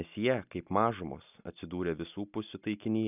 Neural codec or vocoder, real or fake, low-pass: none; real; 3.6 kHz